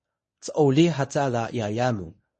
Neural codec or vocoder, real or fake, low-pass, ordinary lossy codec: codec, 24 kHz, 0.9 kbps, WavTokenizer, medium speech release version 1; fake; 10.8 kHz; MP3, 32 kbps